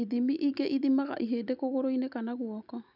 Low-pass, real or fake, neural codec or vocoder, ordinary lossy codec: 5.4 kHz; real; none; none